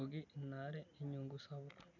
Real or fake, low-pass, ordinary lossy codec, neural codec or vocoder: real; 7.2 kHz; none; none